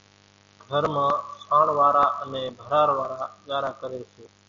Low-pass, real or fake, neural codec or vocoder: 7.2 kHz; real; none